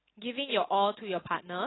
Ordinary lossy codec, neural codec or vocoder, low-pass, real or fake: AAC, 16 kbps; none; 7.2 kHz; real